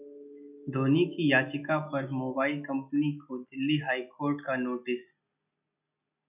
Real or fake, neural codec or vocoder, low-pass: real; none; 3.6 kHz